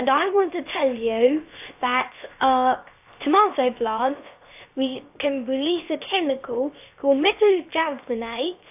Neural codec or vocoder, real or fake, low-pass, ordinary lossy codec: codec, 16 kHz, 0.7 kbps, FocalCodec; fake; 3.6 kHz; AAC, 32 kbps